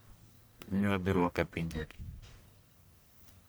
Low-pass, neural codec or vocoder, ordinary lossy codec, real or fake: none; codec, 44.1 kHz, 1.7 kbps, Pupu-Codec; none; fake